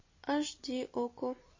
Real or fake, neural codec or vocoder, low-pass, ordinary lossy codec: real; none; 7.2 kHz; MP3, 32 kbps